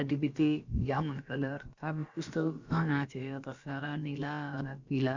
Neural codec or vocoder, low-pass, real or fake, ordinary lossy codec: codec, 16 kHz, about 1 kbps, DyCAST, with the encoder's durations; 7.2 kHz; fake; Opus, 64 kbps